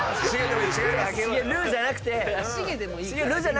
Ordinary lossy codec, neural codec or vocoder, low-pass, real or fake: none; none; none; real